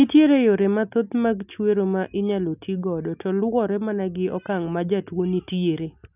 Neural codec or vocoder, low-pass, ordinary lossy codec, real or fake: none; 3.6 kHz; none; real